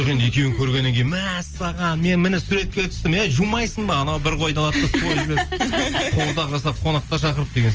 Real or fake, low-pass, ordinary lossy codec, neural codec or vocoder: real; 7.2 kHz; Opus, 24 kbps; none